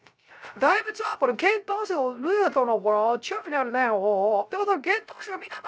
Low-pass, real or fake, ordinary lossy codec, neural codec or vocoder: none; fake; none; codec, 16 kHz, 0.3 kbps, FocalCodec